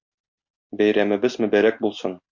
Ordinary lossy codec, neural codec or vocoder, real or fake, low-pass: MP3, 48 kbps; none; real; 7.2 kHz